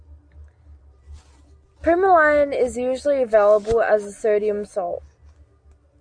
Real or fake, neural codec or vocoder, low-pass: real; none; 9.9 kHz